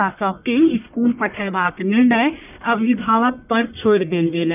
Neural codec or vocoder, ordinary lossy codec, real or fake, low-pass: codec, 44.1 kHz, 1.7 kbps, Pupu-Codec; none; fake; 3.6 kHz